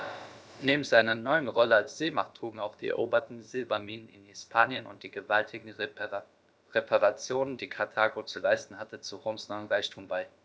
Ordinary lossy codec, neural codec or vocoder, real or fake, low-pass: none; codec, 16 kHz, about 1 kbps, DyCAST, with the encoder's durations; fake; none